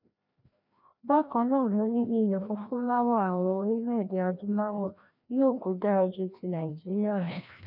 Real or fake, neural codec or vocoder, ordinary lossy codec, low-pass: fake; codec, 16 kHz, 1 kbps, FreqCodec, larger model; none; 5.4 kHz